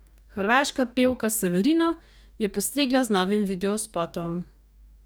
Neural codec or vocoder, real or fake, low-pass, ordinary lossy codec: codec, 44.1 kHz, 2.6 kbps, DAC; fake; none; none